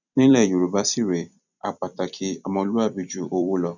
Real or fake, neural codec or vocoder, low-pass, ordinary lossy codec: fake; vocoder, 44.1 kHz, 128 mel bands every 256 samples, BigVGAN v2; 7.2 kHz; none